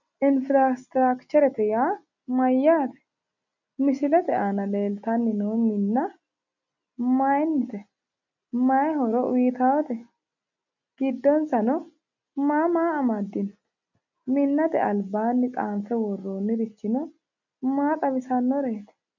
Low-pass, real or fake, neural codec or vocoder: 7.2 kHz; real; none